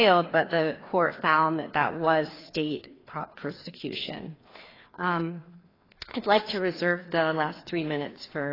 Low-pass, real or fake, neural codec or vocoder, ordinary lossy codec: 5.4 kHz; fake; codec, 16 kHz, 2 kbps, FreqCodec, larger model; AAC, 24 kbps